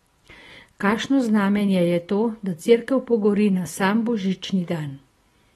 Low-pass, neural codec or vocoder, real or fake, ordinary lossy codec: 19.8 kHz; none; real; AAC, 32 kbps